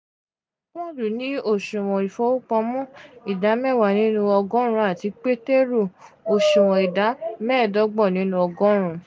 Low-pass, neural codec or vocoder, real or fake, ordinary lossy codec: none; none; real; none